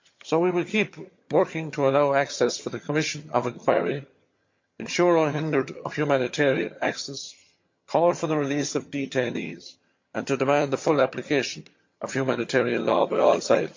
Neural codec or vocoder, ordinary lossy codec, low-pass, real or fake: vocoder, 22.05 kHz, 80 mel bands, HiFi-GAN; MP3, 48 kbps; 7.2 kHz; fake